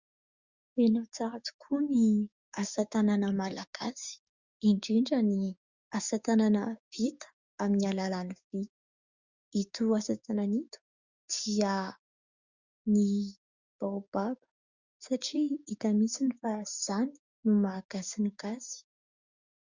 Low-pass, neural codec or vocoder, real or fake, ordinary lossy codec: 7.2 kHz; codec, 16 kHz, 6 kbps, DAC; fake; Opus, 64 kbps